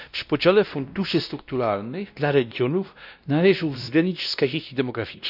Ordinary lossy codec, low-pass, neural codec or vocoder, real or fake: none; 5.4 kHz; codec, 16 kHz, 1 kbps, X-Codec, WavLM features, trained on Multilingual LibriSpeech; fake